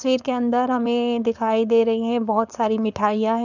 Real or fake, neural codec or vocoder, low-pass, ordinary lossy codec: fake; codec, 16 kHz, 4.8 kbps, FACodec; 7.2 kHz; none